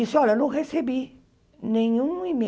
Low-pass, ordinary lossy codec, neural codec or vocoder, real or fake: none; none; none; real